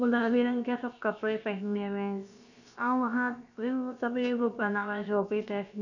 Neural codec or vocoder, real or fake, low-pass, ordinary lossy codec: codec, 16 kHz, about 1 kbps, DyCAST, with the encoder's durations; fake; 7.2 kHz; none